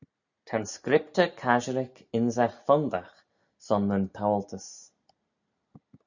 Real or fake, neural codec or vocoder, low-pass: real; none; 7.2 kHz